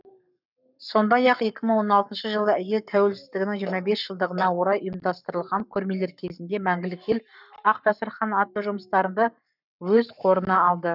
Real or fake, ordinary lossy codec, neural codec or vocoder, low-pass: fake; none; codec, 44.1 kHz, 7.8 kbps, Pupu-Codec; 5.4 kHz